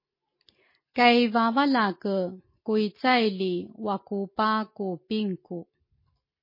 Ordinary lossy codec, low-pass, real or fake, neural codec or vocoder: MP3, 24 kbps; 5.4 kHz; real; none